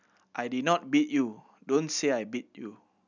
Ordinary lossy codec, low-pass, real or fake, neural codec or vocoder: none; 7.2 kHz; real; none